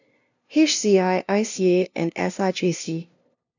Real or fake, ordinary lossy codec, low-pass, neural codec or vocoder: fake; none; 7.2 kHz; codec, 16 kHz, 0.5 kbps, FunCodec, trained on LibriTTS, 25 frames a second